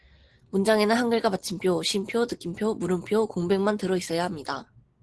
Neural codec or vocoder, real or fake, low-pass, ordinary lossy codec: none; real; 10.8 kHz; Opus, 16 kbps